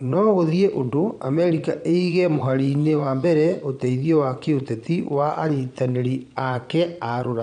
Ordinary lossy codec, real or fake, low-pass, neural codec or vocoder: none; fake; 9.9 kHz; vocoder, 22.05 kHz, 80 mel bands, Vocos